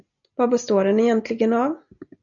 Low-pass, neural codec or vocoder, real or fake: 7.2 kHz; none; real